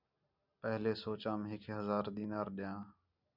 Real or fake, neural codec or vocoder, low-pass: real; none; 5.4 kHz